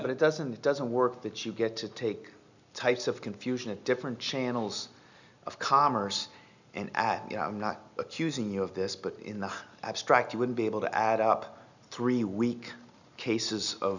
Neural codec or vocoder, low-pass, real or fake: none; 7.2 kHz; real